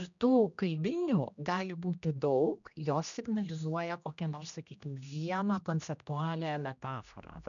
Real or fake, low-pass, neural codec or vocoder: fake; 7.2 kHz; codec, 16 kHz, 1 kbps, X-Codec, HuBERT features, trained on general audio